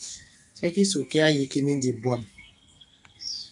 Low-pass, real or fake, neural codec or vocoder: 10.8 kHz; fake; codec, 44.1 kHz, 2.6 kbps, SNAC